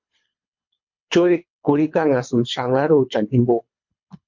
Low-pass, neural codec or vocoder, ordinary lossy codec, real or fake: 7.2 kHz; codec, 24 kHz, 3 kbps, HILCodec; MP3, 48 kbps; fake